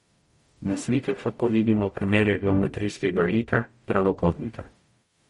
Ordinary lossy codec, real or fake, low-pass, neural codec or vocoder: MP3, 48 kbps; fake; 19.8 kHz; codec, 44.1 kHz, 0.9 kbps, DAC